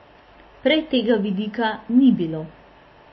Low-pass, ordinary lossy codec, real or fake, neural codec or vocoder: 7.2 kHz; MP3, 24 kbps; real; none